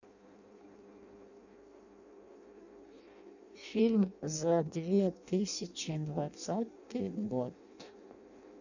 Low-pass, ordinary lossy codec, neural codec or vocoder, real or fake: 7.2 kHz; none; codec, 16 kHz in and 24 kHz out, 0.6 kbps, FireRedTTS-2 codec; fake